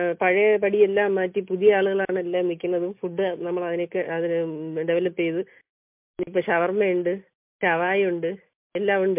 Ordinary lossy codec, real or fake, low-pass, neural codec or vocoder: MP3, 32 kbps; real; 3.6 kHz; none